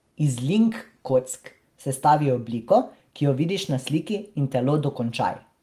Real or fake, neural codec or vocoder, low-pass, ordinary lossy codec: real; none; 14.4 kHz; Opus, 24 kbps